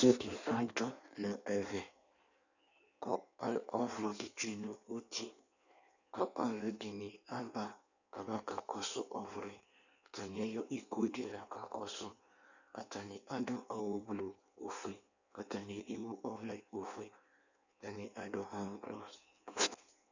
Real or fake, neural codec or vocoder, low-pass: fake; codec, 16 kHz in and 24 kHz out, 1.1 kbps, FireRedTTS-2 codec; 7.2 kHz